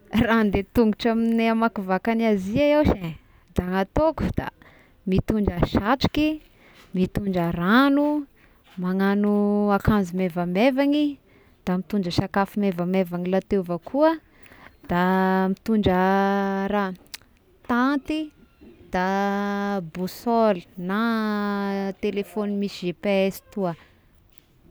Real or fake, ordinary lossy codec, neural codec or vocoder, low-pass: real; none; none; none